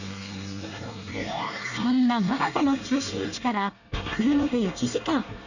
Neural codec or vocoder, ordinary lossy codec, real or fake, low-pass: codec, 24 kHz, 1 kbps, SNAC; none; fake; 7.2 kHz